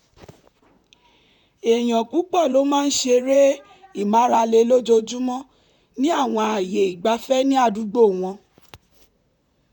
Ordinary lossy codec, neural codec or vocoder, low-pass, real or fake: none; vocoder, 44.1 kHz, 128 mel bands, Pupu-Vocoder; 19.8 kHz; fake